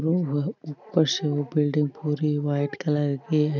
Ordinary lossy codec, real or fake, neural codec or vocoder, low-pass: none; real; none; 7.2 kHz